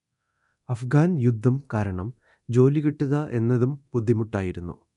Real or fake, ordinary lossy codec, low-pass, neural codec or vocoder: fake; none; 10.8 kHz; codec, 24 kHz, 0.9 kbps, DualCodec